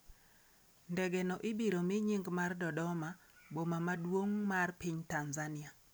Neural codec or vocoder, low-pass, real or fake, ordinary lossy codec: none; none; real; none